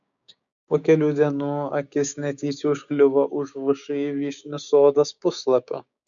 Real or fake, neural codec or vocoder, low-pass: fake; codec, 16 kHz, 6 kbps, DAC; 7.2 kHz